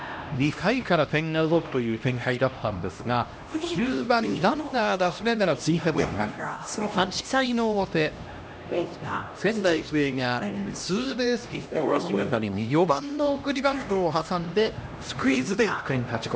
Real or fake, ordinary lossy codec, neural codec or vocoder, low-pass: fake; none; codec, 16 kHz, 1 kbps, X-Codec, HuBERT features, trained on LibriSpeech; none